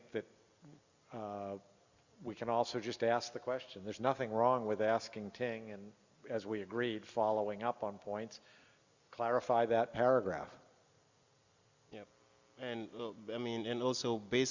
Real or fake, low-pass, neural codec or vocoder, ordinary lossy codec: real; 7.2 kHz; none; Opus, 64 kbps